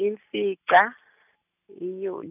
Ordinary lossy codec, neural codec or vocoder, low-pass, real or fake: none; none; 3.6 kHz; real